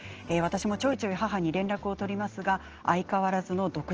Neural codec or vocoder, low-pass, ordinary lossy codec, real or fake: none; 7.2 kHz; Opus, 16 kbps; real